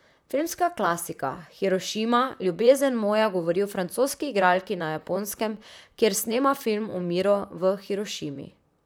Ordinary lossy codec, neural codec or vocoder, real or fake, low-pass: none; vocoder, 44.1 kHz, 128 mel bands, Pupu-Vocoder; fake; none